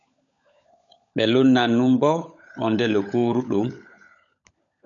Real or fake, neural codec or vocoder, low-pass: fake; codec, 16 kHz, 16 kbps, FunCodec, trained on LibriTTS, 50 frames a second; 7.2 kHz